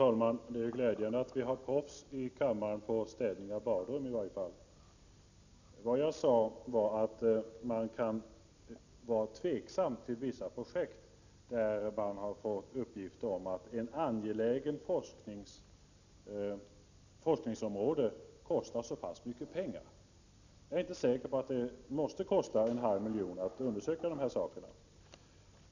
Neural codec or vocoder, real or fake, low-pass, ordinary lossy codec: none; real; 7.2 kHz; none